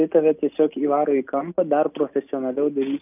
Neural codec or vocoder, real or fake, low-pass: none; real; 3.6 kHz